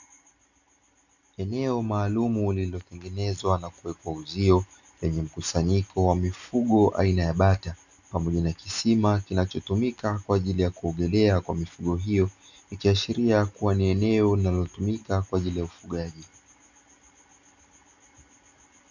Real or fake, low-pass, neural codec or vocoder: real; 7.2 kHz; none